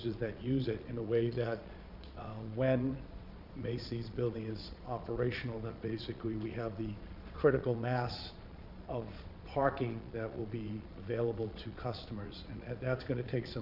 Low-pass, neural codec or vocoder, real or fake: 5.4 kHz; vocoder, 22.05 kHz, 80 mel bands, Vocos; fake